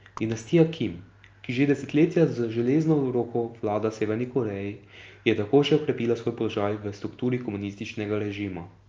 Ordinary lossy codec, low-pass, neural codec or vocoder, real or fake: Opus, 24 kbps; 7.2 kHz; none; real